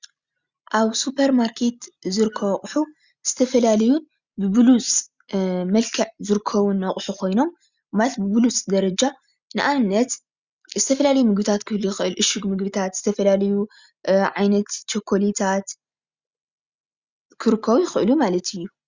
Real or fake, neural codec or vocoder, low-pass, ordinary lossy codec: real; none; 7.2 kHz; Opus, 64 kbps